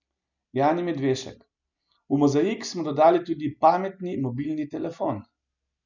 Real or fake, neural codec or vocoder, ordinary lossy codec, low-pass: real; none; none; 7.2 kHz